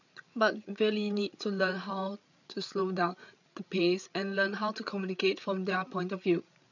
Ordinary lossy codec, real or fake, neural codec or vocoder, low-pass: none; fake; codec, 16 kHz, 8 kbps, FreqCodec, larger model; 7.2 kHz